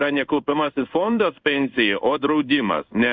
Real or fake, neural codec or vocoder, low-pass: fake; codec, 16 kHz in and 24 kHz out, 1 kbps, XY-Tokenizer; 7.2 kHz